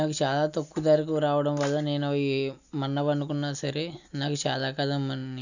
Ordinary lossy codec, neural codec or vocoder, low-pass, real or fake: none; none; 7.2 kHz; real